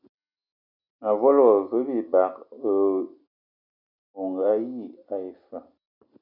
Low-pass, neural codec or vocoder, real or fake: 5.4 kHz; none; real